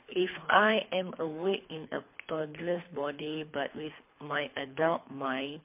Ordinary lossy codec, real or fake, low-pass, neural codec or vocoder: MP3, 24 kbps; fake; 3.6 kHz; codec, 24 kHz, 3 kbps, HILCodec